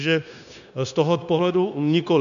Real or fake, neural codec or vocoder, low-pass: fake; codec, 16 kHz, 0.9 kbps, LongCat-Audio-Codec; 7.2 kHz